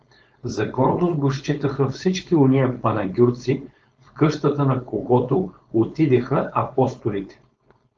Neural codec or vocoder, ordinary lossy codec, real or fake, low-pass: codec, 16 kHz, 4.8 kbps, FACodec; Opus, 24 kbps; fake; 7.2 kHz